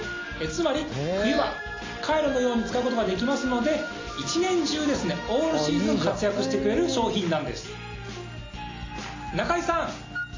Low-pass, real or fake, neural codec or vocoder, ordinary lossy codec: 7.2 kHz; real; none; AAC, 32 kbps